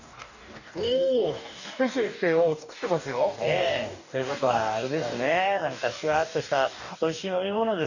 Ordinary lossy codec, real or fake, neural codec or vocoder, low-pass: none; fake; codec, 44.1 kHz, 2.6 kbps, DAC; 7.2 kHz